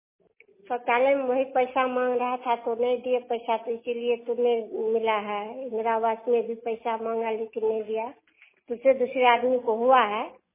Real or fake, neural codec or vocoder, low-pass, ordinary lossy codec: real; none; 3.6 kHz; MP3, 16 kbps